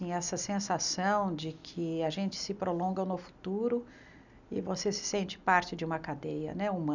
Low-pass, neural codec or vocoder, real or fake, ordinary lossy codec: 7.2 kHz; none; real; none